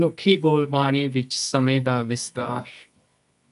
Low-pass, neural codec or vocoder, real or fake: 10.8 kHz; codec, 24 kHz, 0.9 kbps, WavTokenizer, medium music audio release; fake